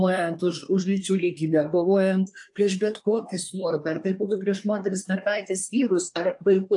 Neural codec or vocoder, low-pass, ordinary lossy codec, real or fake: codec, 24 kHz, 1 kbps, SNAC; 10.8 kHz; AAC, 64 kbps; fake